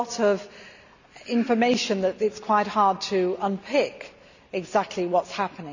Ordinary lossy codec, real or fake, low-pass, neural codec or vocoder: none; real; 7.2 kHz; none